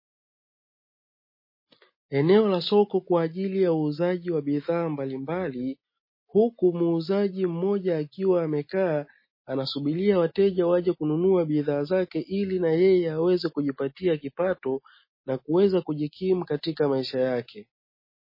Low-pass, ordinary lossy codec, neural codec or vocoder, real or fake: 5.4 kHz; MP3, 24 kbps; none; real